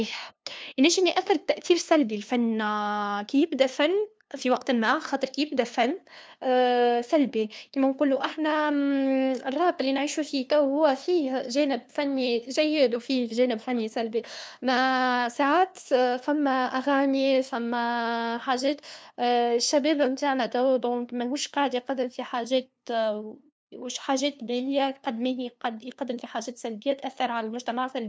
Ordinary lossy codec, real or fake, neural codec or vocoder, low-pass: none; fake; codec, 16 kHz, 2 kbps, FunCodec, trained on LibriTTS, 25 frames a second; none